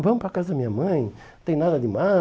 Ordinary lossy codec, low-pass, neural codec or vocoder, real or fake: none; none; none; real